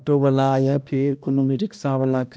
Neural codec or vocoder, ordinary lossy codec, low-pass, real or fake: codec, 16 kHz, 1 kbps, X-Codec, HuBERT features, trained on balanced general audio; none; none; fake